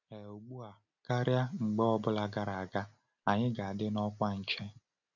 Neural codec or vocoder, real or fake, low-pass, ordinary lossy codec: none; real; 7.2 kHz; none